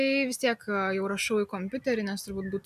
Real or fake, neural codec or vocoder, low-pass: real; none; 14.4 kHz